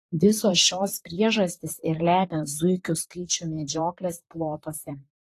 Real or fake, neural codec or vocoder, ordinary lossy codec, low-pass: fake; vocoder, 44.1 kHz, 128 mel bands, Pupu-Vocoder; AAC, 48 kbps; 14.4 kHz